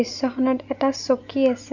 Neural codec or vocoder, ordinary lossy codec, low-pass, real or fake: none; none; 7.2 kHz; real